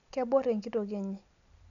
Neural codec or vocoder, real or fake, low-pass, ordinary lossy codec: none; real; 7.2 kHz; none